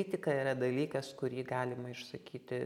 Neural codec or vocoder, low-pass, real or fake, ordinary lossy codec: none; 19.8 kHz; real; MP3, 96 kbps